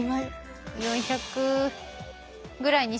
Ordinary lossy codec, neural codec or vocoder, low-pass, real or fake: none; none; none; real